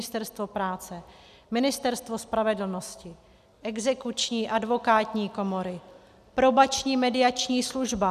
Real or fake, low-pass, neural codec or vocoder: real; 14.4 kHz; none